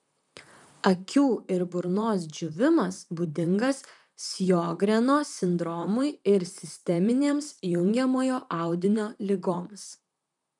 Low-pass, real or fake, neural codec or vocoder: 10.8 kHz; fake; vocoder, 44.1 kHz, 128 mel bands, Pupu-Vocoder